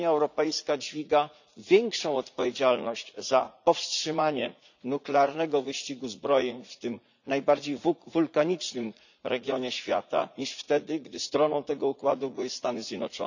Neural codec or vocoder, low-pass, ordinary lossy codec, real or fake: vocoder, 44.1 kHz, 80 mel bands, Vocos; 7.2 kHz; none; fake